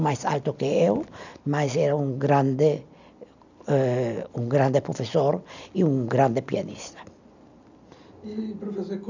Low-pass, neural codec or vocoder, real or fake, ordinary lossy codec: 7.2 kHz; none; real; none